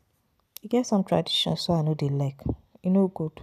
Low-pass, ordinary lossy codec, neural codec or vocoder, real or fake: 14.4 kHz; none; none; real